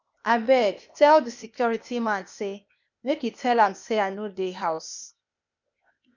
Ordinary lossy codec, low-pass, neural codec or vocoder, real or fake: none; 7.2 kHz; codec, 16 kHz, 0.8 kbps, ZipCodec; fake